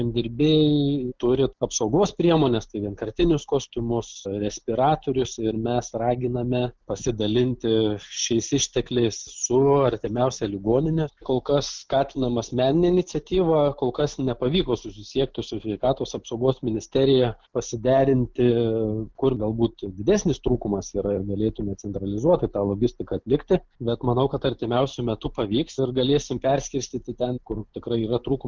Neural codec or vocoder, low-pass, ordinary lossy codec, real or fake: none; 7.2 kHz; Opus, 24 kbps; real